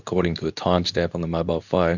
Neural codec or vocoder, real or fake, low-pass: codec, 24 kHz, 0.9 kbps, WavTokenizer, medium speech release version 2; fake; 7.2 kHz